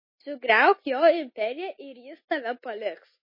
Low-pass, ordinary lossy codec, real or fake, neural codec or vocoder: 5.4 kHz; MP3, 24 kbps; real; none